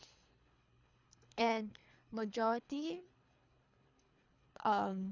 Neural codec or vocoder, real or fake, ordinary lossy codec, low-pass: codec, 24 kHz, 3 kbps, HILCodec; fake; none; 7.2 kHz